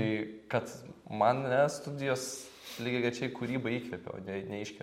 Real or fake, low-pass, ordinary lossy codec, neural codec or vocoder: real; 19.8 kHz; MP3, 64 kbps; none